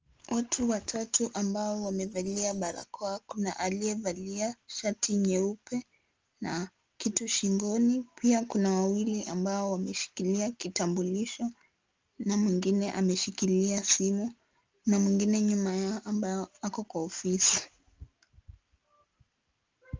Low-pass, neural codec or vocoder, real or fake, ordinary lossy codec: 7.2 kHz; none; real; Opus, 24 kbps